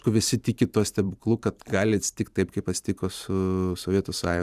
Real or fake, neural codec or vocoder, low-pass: fake; vocoder, 44.1 kHz, 128 mel bands every 512 samples, BigVGAN v2; 14.4 kHz